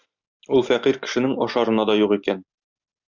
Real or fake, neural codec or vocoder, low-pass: real; none; 7.2 kHz